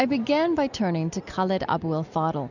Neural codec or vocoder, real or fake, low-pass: none; real; 7.2 kHz